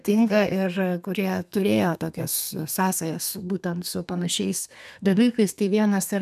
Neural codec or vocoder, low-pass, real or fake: codec, 32 kHz, 1.9 kbps, SNAC; 14.4 kHz; fake